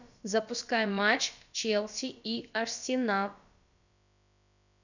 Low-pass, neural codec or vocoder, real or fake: 7.2 kHz; codec, 16 kHz, about 1 kbps, DyCAST, with the encoder's durations; fake